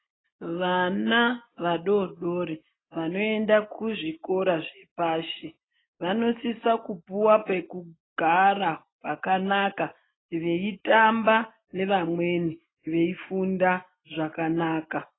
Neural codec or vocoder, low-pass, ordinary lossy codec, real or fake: none; 7.2 kHz; AAC, 16 kbps; real